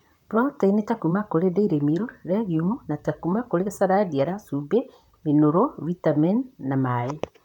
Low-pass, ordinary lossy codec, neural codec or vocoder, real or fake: 19.8 kHz; none; vocoder, 44.1 kHz, 128 mel bands, Pupu-Vocoder; fake